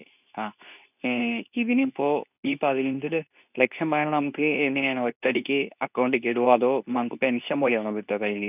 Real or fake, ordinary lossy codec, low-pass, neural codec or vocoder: fake; none; 3.6 kHz; codec, 24 kHz, 0.9 kbps, WavTokenizer, medium speech release version 2